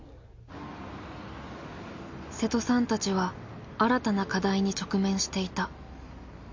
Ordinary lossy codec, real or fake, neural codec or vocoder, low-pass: MP3, 48 kbps; real; none; 7.2 kHz